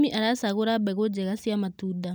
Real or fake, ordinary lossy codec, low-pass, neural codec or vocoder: real; none; none; none